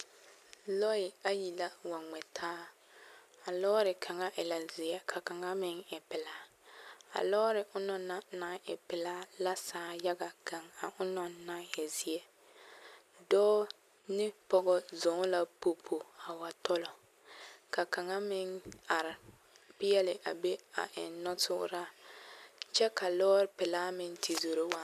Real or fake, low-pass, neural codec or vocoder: real; 14.4 kHz; none